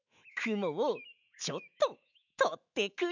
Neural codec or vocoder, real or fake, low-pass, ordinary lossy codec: autoencoder, 48 kHz, 128 numbers a frame, DAC-VAE, trained on Japanese speech; fake; 7.2 kHz; none